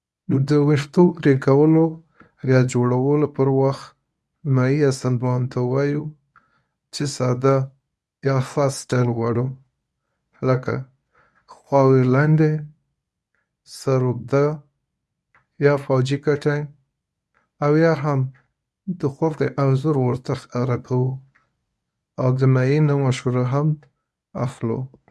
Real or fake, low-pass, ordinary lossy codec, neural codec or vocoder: fake; none; none; codec, 24 kHz, 0.9 kbps, WavTokenizer, medium speech release version 1